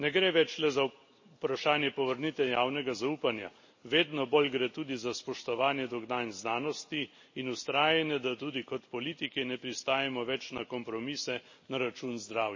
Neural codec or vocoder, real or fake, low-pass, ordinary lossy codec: none; real; 7.2 kHz; MP3, 32 kbps